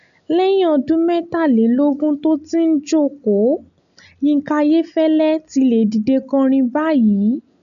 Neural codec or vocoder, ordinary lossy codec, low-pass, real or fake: none; none; 7.2 kHz; real